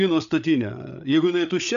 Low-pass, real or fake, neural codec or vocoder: 7.2 kHz; fake; codec, 16 kHz, 8 kbps, FreqCodec, larger model